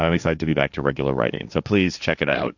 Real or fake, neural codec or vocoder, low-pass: fake; codec, 16 kHz, 1.1 kbps, Voila-Tokenizer; 7.2 kHz